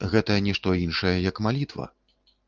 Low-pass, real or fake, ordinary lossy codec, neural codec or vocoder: 7.2 kHz; real; Opus, 24 kbps; none